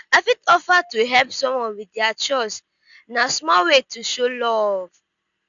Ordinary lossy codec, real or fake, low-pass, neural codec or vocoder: none; real; 7.2 kHz; none